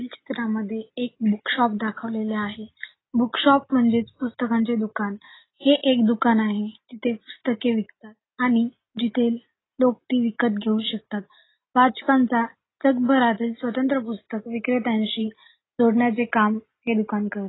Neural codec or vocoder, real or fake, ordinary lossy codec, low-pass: none; real; AAC, 16 kbps; 7.2 kHz